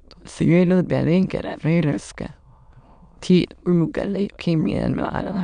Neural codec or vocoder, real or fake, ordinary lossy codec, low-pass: autoencoder, 22.05 kHz, a latent of 192 numbers a frame, VITS, trained on many speakers; fake; none; 9.9 kHz